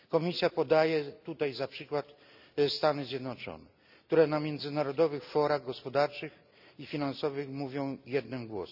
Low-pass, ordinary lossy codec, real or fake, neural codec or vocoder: 5.4 kHz; none; real; none